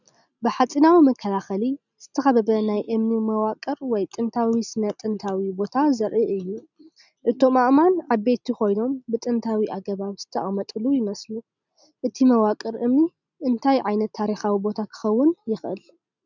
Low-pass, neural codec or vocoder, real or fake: 7.2 kHz; none; real